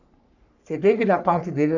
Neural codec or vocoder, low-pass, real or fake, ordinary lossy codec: codec, 44.1 kHz, 3.4 kbps, Pupu-Codec; 7.2 kHz; fake; none